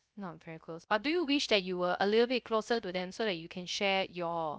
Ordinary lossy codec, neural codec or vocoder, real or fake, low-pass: none; codec, 16 kHz, 0.3 kbps, FocalCodec; fake; none